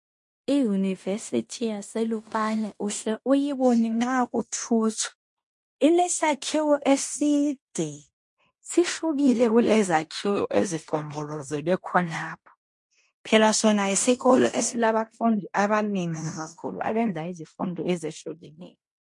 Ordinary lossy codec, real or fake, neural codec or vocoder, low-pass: MP3, 48 kbps; fake; codec, 16 kHz in and 24 kHz out, 0.9 kbps, LongCat-Audio-Codec, fine tuned four codebook decoder; 10.8 kHz